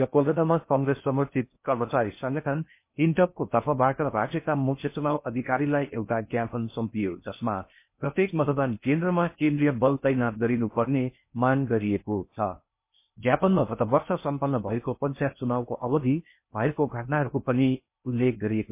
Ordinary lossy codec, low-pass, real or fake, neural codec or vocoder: MP3, 24 kbps; 3.6 kHz; fake; codec, 16 kHz in and 24 kHz out, 0.6 kbps, FocalCodec, streaming, 4096 codes